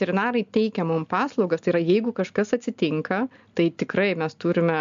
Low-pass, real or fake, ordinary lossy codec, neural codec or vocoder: 7.2 kHz; real; MP3, 64 kbps; none